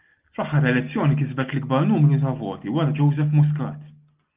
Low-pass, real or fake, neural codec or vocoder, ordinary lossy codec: 3.6 kHz; real; none; Opus, 24 kbps